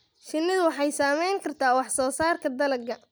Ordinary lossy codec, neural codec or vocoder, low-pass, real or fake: none; none; none; real